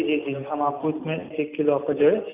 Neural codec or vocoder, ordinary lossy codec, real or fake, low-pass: none; none; real; 3.6 kHz